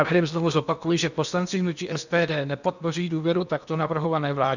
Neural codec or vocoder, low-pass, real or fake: codec, 16 kHz in and 24 kHz out, 0.8 kbps, FocalCodec, streaming, 65536 codes; 7.2 kHz; fake